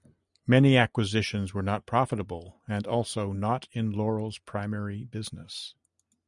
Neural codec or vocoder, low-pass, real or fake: none; 10.8 kHz; real